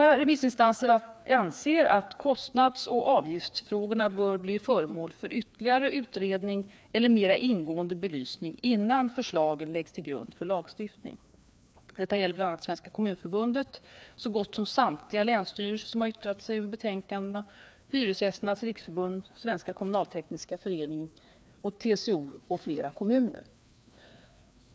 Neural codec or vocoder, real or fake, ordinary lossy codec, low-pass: codec, 16 kHz, 2 kbps, FreqCodec, larger model; fake; none; none